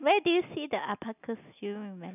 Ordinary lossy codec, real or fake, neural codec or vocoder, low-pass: none; real; none; 3.6 kHz